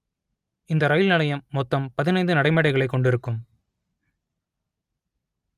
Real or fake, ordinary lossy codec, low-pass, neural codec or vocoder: real; Opus, 32 kbps; 14.4 kHz; none